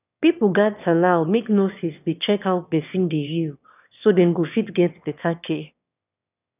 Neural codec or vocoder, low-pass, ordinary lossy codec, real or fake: autoencoder, 22.05 kHz, a latent of 192 numbers a frame, VITS, trained on one speaker; 3.6 kHz; none; fake